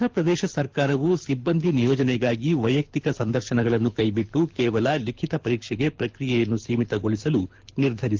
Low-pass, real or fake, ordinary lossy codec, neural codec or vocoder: 7.2 kHz; fake; Opus, 32 kbps; codec, 24 kHz, 6 kbps, HILCodec